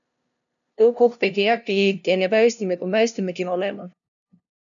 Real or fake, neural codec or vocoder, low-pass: fake; codec, 16 kHz, 0.5 kbps, FunCodec, trained on LibriTTS, 25 frames a second; 7.2 kHz